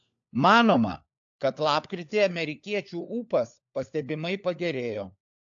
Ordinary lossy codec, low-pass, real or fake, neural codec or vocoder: AAC, 64 kbps; 7.2 kHz; fake; codec, 16 kHz, 4 kbps, FunCodec, trained on LibriTTS, 50 frames a second